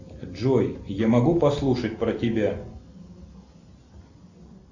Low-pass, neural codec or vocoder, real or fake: 7.2 kHz; none; real